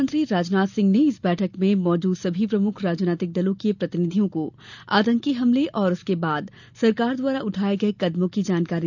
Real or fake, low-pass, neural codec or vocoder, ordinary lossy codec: real; 7.2 kHz; none; none